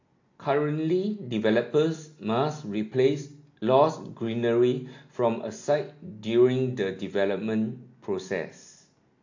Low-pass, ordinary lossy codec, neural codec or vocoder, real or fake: 7.2 kHz; AAC, 48 kbps; none; real